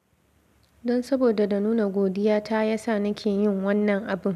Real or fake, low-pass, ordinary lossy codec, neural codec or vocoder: real; 14.4 kHz; none; none